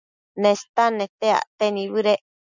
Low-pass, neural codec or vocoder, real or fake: 7.2 kHz; none; real